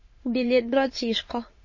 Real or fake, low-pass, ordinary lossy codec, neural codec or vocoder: fake; 7.2 kHz; MP3, 32 kbps; autoencoder, 22.05 kHz, a latent of 192 numbers a frame, VITS, trained on many speakers